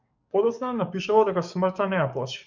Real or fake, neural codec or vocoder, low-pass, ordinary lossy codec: fake; codec, 44.1 kHz, 7.8 kbps, DAC; 7.2 kHz; MP3, 64 kbps